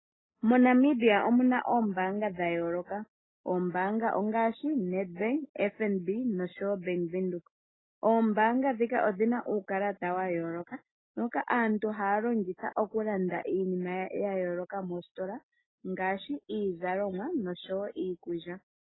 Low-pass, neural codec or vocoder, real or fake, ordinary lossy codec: 7.2 kHz; none; real; AAC, 16 kbps